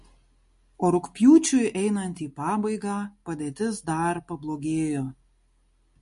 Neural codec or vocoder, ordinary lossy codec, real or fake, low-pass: none; MP3, 48 kbps; real; 14.4 kHz